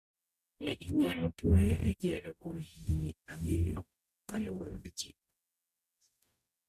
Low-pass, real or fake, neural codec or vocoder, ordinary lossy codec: 14.4 kHz; fake; codec, 44.1 kHz, 0.9 kbps, DAC; none